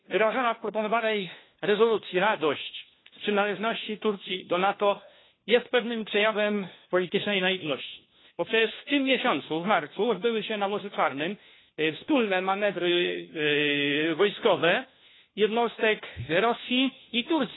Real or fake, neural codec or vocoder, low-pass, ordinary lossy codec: fake; codec, 16 kHz, 1 kbps, FunCodec, trained on LibriTTS, 50 frames a second; 7.2 kHz; AAC, 16 kbps